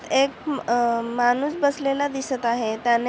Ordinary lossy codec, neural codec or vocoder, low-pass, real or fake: none; none; none; real